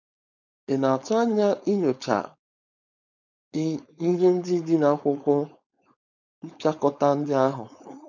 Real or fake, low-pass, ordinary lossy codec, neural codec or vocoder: fake; 7.2 kHz; none; codec, 16 kHz, 4.8 kbps, FACodec